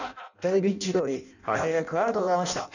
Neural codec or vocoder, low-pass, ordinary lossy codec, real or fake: codec, 16 kHz in and 24 kHz out, 0.6 kbps, FireRedTTS-2 codec; 7.2 kHz; none; fake